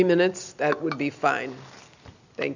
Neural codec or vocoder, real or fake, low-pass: none; real; 7.2 kHz